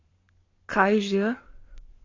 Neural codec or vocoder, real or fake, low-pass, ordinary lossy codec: codec, 44.1 kHz, 7.8 kbps, Pupu-Codec; fake; 7.2 kHz; AAC, 32 kbps